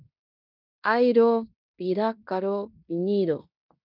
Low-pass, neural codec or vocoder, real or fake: 5.4 kHz; codec, 16 kHz in and 24 kHz out, 0.9 kbps, LongCat-Audio-Codec, fine tuned four codebook decoder; fake